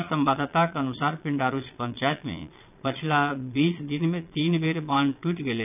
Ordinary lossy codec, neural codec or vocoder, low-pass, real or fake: none; vocoder, 22.05 kHz, 80 mel bands, Vocos; 3.6 kHz; fake